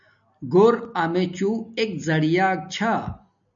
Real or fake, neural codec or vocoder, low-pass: real; none; 7.2 kHz